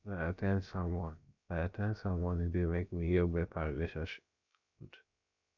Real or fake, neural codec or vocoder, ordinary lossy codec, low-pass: fake; codec, 16 kHz, about 1 kbps, DyCAST, with the encoder's durations; MP3, 64 kbps; 7.2 kHz